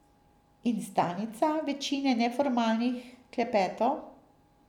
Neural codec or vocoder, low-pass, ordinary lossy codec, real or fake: none; 19.8 kHz; none; real